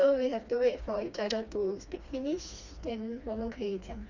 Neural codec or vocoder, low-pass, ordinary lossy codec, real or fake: codec, 16 kHz, 2 kbps, FreqCodec, smaller model; 7.2 kHz; none; fake